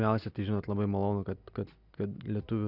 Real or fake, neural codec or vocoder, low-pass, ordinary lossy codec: real; none; 5.4 kHz; Opus, 64 kbps